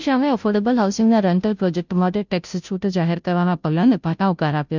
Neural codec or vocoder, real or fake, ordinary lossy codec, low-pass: codec, 16 kHz, 0.5 kbps, FunCodec, trained on Chinese and English, 25 frames a second; fake; none; 7.2 kHz